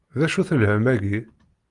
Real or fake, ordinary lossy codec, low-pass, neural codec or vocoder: real; Opus, 24 kbps; 10.8 kHz; none